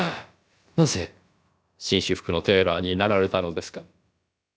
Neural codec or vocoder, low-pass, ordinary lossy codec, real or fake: codec, 16 kHz, about 1 kbps, DyCAST, with the encoder's durations; none; none; fake